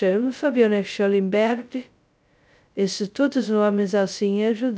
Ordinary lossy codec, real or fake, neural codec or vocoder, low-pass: none; fake; codec, 16 kHz, 0.2 kbps, FocalCodec; none